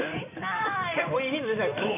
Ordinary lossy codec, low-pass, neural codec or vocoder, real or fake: none; 3.6 kHz; codec, 16 kHz in and 24 kHz out, 2.2 kbps, FireRedTTS-2 codec; fake